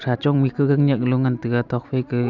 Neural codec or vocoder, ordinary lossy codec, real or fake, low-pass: none; none; real; 7.2 kHz